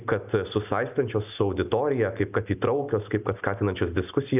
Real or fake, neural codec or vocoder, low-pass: real; none; 3.6 kHz